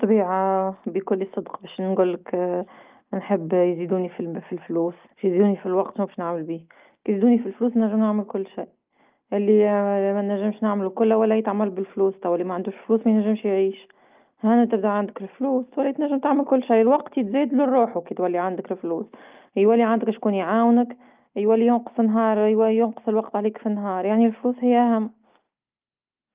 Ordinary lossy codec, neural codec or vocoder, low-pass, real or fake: Opus, 24 kbps; none; 3.6 kHz; real